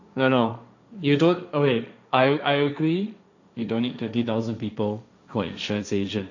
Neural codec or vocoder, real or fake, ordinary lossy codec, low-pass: codec, 16 kHz, 1.1 kbps, Voila-Tokenizer; fake; none; 7.2 kHz